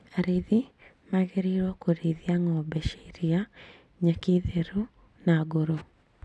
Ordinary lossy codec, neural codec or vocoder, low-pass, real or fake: none; none; none; real